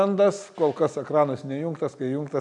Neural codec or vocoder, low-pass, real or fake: none; 10.8 kHz; real